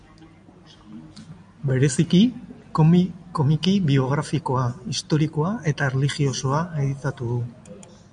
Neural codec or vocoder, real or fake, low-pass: none; real; 9.9 kHz